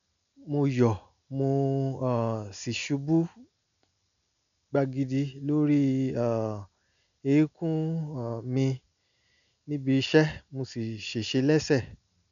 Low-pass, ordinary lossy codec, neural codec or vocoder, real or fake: 7.2 kHz; none; none; real